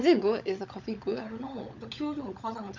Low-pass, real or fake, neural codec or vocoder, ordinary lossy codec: 7.2 kHz; fake; codec, 16 kHz, 16 kbps, FunCodec, trained on LibriTTS, 50 frames a second; MP3, 64 kbps